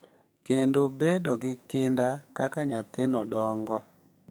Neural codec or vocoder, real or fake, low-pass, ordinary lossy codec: codec, 44.1 kHz, 2.6 kbps, SNAC; fake; none; none